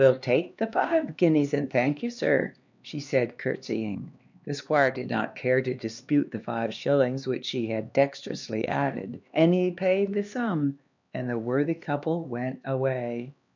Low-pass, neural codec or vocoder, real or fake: 7.2 kHz; codec, 16 kHz, 2 kbps, X-Codec, HuBERT features, trained on LibriSpeech; fake